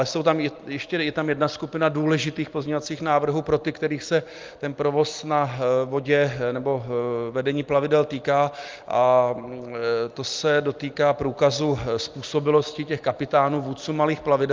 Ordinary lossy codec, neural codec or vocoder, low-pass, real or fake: Opus, 32 kbps; none; 7.2 kHz; real